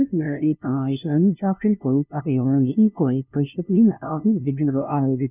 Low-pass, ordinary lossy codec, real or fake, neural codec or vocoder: 3.6 kHz; none; fake; codec, 16 kHz, 0.5 kbps, FunCodec, trained on LibriTTS, 25 frames a second